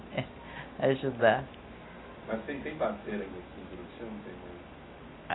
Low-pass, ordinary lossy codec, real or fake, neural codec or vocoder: 7.2 kHz; AAC, 16 kbps; real; none